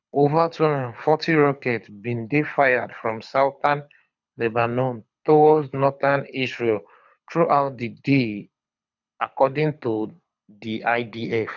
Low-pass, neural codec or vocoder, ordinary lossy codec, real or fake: 7.2 kHz; codec, 24 kHz, 6 kbps, HILCodec; none; fake